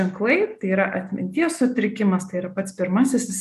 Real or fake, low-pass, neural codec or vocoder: real; 14.4 kHz; none